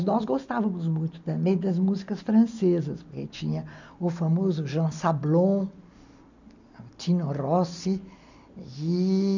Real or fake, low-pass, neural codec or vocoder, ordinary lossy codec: real; 7.2 kHz; none; none